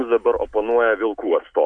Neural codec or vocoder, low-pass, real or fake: none; 9.9 kHz; real